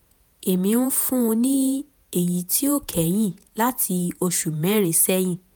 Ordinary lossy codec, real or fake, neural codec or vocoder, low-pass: none; fake; vocoder, 48 kHz, 128 mel bands, Vocos; none